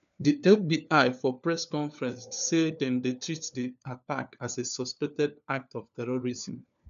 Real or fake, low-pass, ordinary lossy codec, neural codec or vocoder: fake; 7.2 kHz; none; codec, 16 kHz, 4 kbps, FunCodec, trained on LibriTTS, 50 frames a second